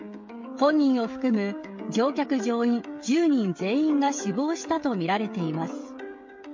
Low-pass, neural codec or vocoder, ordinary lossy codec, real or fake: 7.2 kHz; codec, 16 kHz, 16 kbps, FreqCodec, smaller model; MP3, 48 kbps; fake